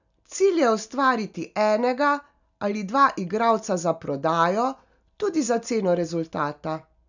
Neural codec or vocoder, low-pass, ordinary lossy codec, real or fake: none; 7.2 kHz; none; real